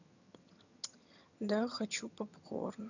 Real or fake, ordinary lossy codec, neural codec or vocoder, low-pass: fake; none; vocoder, 22.05 kHz, 80 mel bands, HiFi-GAN; 7.2 kHz